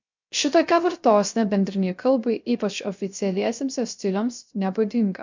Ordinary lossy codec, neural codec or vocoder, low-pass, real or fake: MP3, 64 kbps; codec, 16 kHz, 0.3 kbps, FocalCodec; 7.2 kHz; fake